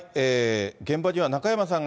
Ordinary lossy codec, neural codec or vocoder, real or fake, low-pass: none; none; real; none